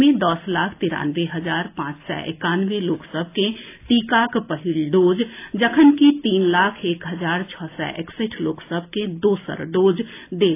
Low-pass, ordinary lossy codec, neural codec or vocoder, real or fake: 3.6 kHz; AAC, 24 kbps; none; real